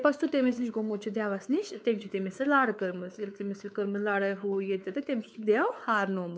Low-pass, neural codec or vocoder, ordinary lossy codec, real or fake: none; codec, 16 kHz, 4 kbps, X-Codec, WavLM features, trained on Multilingual LibriSpeech; none; fake